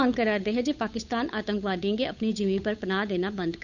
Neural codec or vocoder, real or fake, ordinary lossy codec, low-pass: codec, 16 kHz, 8 kbps, FunCodec, trained on Chinese and English, 25 frames a second; fake; none; 7.2 kHz